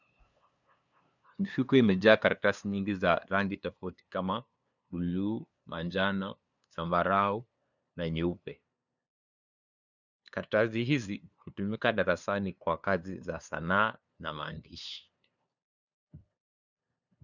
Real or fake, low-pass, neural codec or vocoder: fake; 7.2 kHz; codec, 16 kHz, 2 kbps, FunCodec, trained on LibriTTS, 25 frames a second